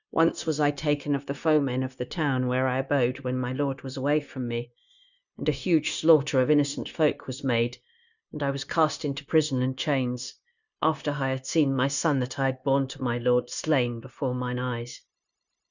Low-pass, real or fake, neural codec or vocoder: 7.2 kHz; fake; codec, 16 kHz, 0.9 kbps, LongCat-Audio-Codec